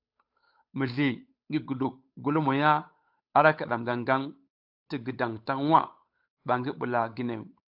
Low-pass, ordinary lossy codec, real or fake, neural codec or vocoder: 5.4 kHz; AAC, 48 kbps; fake; codec, 16 kHz, 8 kbps, FunCodec, trained on Chinese and English, 25 frames a second